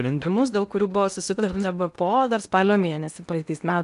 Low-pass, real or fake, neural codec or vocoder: 10.8 kHz; fake; codec, 16 kHz in and 24 kHz out, 0.8 kbps, FocalCodec, streaming, 65536 codes